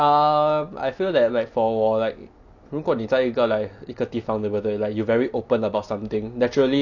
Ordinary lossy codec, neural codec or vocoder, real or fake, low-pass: none; none; real; 7.2 kHz